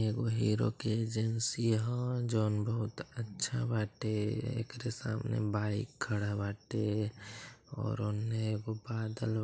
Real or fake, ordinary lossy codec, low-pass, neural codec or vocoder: real; none; none; none